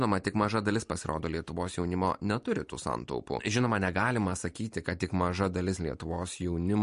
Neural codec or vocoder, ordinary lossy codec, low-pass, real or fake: none; MP3, 48 kbps; 10.8 kHz; real